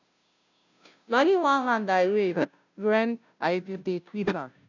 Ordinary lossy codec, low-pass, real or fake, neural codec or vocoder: none; 7.2 kHz; fake; codec, 16 kHz, 0.5 kbps, FunCodec, trained on Chinese and English, 25 frames a second